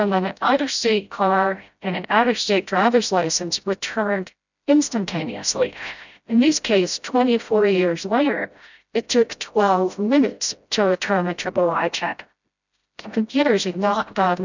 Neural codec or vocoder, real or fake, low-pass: codec, 16 kHz, 0.5 kbps, FreqCodec, smaller model; fake; 7.2 kHz